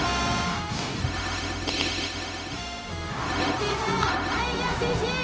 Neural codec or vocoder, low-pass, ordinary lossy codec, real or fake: codec, 16 kHz, 0.4 kbps, LongCat-Audio-Codec; none; none; fake